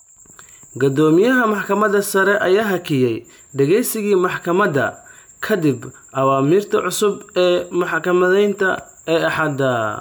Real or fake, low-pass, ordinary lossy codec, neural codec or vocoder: real; none; none; none